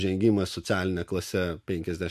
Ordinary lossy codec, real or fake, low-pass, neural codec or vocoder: MP3, 64 kbps; real; 14.4 kHz; none